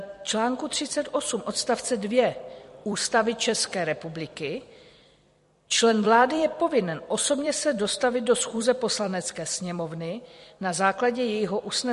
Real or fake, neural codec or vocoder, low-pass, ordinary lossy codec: fake; vocoder, 44.1 kHz, 128 mel bands every 256 samples, BigVGAN v2; 14.4 kHz; MP3, 48 kbps